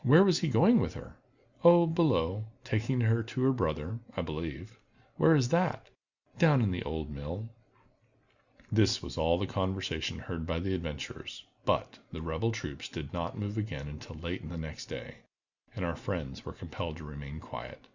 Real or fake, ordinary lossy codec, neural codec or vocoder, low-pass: real; Opus, 64 kbps; none; 7.2 kHz